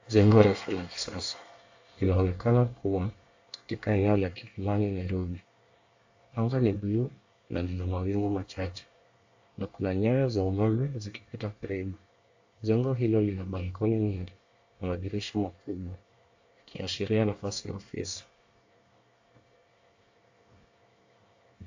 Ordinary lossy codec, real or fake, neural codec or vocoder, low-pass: AAC, 48 kbps; fake; codec, 24 kHz, 1 kbps, SNAC; 7.2 kHz